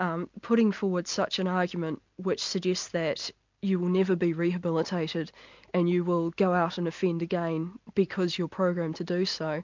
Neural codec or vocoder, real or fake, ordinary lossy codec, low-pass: none; real; MP3, 64 kbps; 7.2 kHz